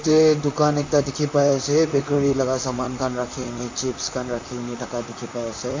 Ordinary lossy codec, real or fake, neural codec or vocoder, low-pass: AAC, 48 kbps; fake; vocoder, 44.1 kHz, 128 mel bands, Pupu-Vocoder; 7.2 kHz